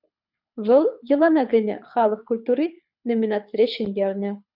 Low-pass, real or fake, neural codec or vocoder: 5.4 kHz; fake; codec, 24 kHz, 6 kbps, HILCodec